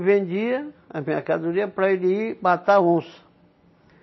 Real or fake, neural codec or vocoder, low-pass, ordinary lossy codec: real; none; 7.2 kHz; MP3, 24 kbps